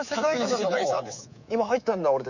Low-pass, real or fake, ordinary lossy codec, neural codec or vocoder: 7.2 kHz; fake; none; codec, 24 kHz, 3.1 kbps, DualCodec